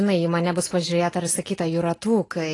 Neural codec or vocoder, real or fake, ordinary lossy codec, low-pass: none; real; AAC, 32 kbps; 10.8 kHz